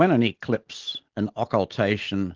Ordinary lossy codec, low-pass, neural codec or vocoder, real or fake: Opus, 16 kbps; 7.2 kHz; none; real